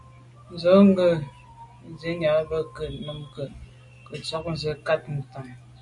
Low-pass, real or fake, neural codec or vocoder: 10.8 kHz; real; none